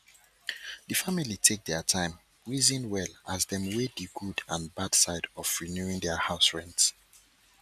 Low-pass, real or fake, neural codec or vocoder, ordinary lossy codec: 14.4 kHz; real; none; none